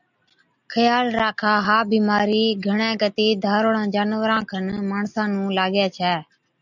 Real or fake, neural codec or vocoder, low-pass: real; none; 7.2 kHz